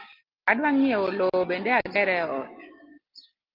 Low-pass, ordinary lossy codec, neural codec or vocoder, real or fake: 5.4 kHz; Opus, 32 kbps; none; real